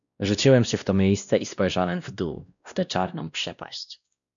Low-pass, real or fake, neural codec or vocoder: 7.2 kHz; fake; codec, 16 kHz, 1 kbps, X-Codec, WavLM features, trained on Multilingual LibriSpeech